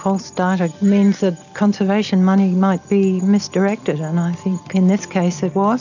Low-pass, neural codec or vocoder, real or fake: 7.2 kHz; none; real